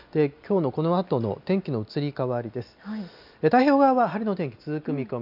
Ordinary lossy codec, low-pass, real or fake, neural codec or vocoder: none; 5.4 kHz; real; none